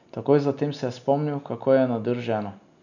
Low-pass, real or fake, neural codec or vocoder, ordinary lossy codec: 7.2 kHz; real; none; none